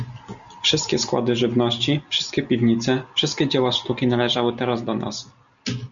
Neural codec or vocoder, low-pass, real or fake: none; 7.2 kHz; real